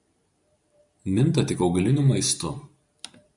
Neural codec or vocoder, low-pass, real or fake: vocoder, 44.1 kHz, 128 mel bands every 512 samples, BigVGAN v2; 10.8 kHz; fake